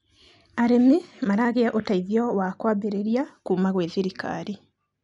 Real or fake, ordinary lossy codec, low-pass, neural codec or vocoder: real; none; 10.8 kHz; none